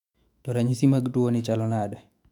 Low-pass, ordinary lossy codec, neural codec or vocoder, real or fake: 19.8 kHz; none; autoencoder, 48 kHz, 128 numbers a frame, DAC-VAE, trained on Japanese speech; fake